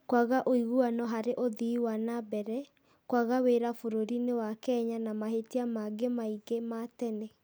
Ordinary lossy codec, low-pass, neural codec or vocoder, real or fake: none; none; none; real